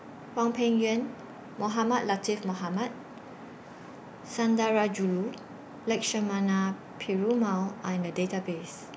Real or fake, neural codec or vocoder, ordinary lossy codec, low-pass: real; none; none; none